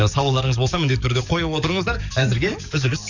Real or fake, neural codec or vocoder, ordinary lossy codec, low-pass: fake; codec, 44.1 kHz, 7.8 kbps, DAC; none; 7.2 kHz